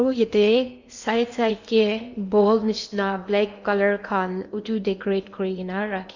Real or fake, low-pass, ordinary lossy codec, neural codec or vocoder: fake; 7.2 kHz; none; codec, 16 kHz in and 24 kHz out, 0.8 kbps, FocalCodec, streaming, 65536 codes